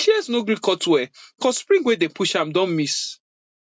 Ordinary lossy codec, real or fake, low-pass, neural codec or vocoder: none; real; none; none